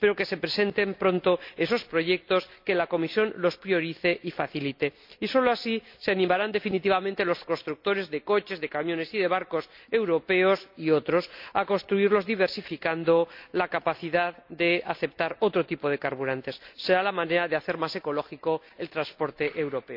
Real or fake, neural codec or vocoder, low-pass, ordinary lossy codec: real; none; 5.4 kHz; MP3, 48 kbps